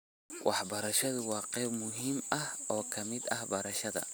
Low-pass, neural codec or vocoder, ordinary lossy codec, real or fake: none; none; none; real